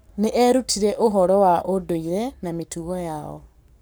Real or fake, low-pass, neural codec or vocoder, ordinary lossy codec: fake; none; codec, 44.1 kHz, 7.8 kbps, Pupu-Codec; none